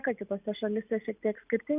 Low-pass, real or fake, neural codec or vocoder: 3.6 kHz; real; none